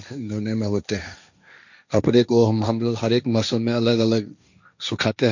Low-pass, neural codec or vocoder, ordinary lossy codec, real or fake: 7.2 kHz; codec, 16 kHz, 1.1 kbps, Voila-Tokenizer; none; fake